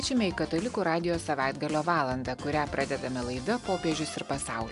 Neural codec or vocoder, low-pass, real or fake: none; 10.8 kHz; real